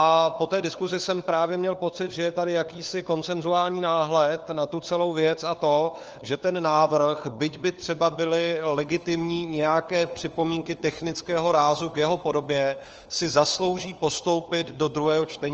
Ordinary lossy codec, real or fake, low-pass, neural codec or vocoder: Opus, 24 kbps; fake; 7.2 kHz; codec, 16 kHz, 4 kbps, FunCodec, trained on LibriTTS, 50 frames a second